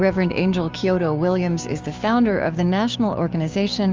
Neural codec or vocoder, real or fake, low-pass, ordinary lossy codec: codec, 16 kHz, 6 kbps, DAC; fake; 7.2 kHz; Opus, 32 kbps